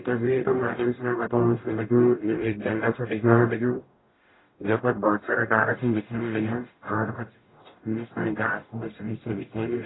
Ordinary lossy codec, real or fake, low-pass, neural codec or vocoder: AAC, 16 kbps; fake; 7.2 kHz; codec, 44.1 kHz, 0.9 kbps, DAC